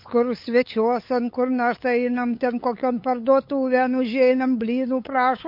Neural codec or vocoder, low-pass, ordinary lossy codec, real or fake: codec, 16 kHz, 8 kbps, FunCodec, trained on LibriTTS, 25 frames a second; 5.4 kHz; MP3, 32 kbps; fake